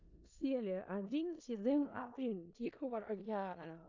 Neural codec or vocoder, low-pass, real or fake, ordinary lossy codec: codec, 16 kHz in and 24 kHz out, 0.4 kbps, LongCat-Audio-Codec, four codebook decoder; 7.2 kHz; fake; AAC, 48 kbps